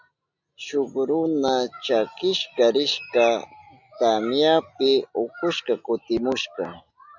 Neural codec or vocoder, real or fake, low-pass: none; real; 7.2 kHz